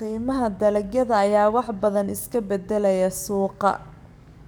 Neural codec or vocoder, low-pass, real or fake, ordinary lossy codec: codec, 44.1 kHz, 7.8 kbps, DAC; none; fake; none